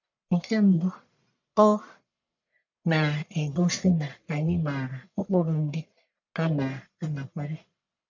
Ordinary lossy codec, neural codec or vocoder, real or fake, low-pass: none; codec, 44.1 kHz, 1.7 kbps, Pupu-Codec; fake; 7.2 kHz